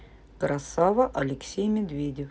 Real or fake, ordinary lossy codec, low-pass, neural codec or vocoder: real; none; none; none